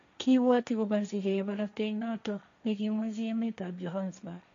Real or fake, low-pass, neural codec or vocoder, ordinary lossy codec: fake; 7.2 kHz; codec, 16 kHz, 1.1 kbps, Voila-Tokenizer; MP3, 64 kbps